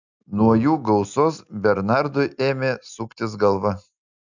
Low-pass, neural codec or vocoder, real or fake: 7.2 kHz; none; real